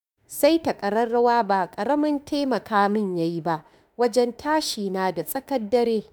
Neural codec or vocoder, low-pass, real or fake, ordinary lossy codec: autoencoder, 48 kHz, 32 numbers a frame, DAC-VAE, trained on Japanese speech; none; fake; none